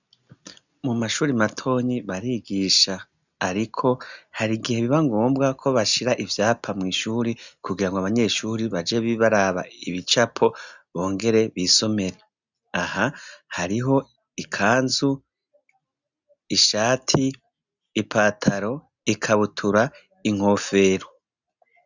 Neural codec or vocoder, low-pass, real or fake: none; 7.2 kHz; real